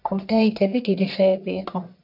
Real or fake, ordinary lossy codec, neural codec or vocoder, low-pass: fake; MP3, 48 kbps; codec, 44.1 kHz, 1.7 kbps, Pupu-Codec; 5.4 kHz